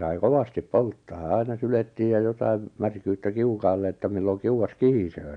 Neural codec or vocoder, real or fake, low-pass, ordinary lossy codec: none; real; 9.9 kHz; none